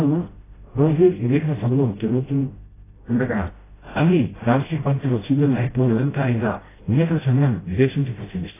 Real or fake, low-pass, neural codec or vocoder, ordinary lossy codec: fake; 3.6 kHz; codec, 16 kHz, 0.5 kbps, FreqCodec, smaller model; AAC, 16 kbps